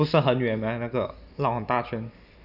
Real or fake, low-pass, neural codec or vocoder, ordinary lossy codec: real; 5.4 kHz; none; none